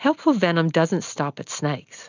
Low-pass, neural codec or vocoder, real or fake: 7.2 kHz; none; real